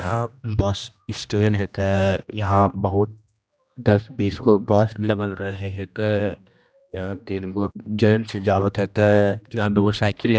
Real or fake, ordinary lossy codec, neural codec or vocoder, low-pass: fake; none; codec, 16 kHz, 1 kbps, X-Codec, HuBERT features, trained on general audio; none